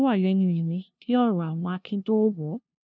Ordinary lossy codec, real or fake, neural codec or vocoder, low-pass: none; fake; codec, 16 kHz, 0.5 kbps, FunCodec, trained on LibriTTS, 25 frames a second; none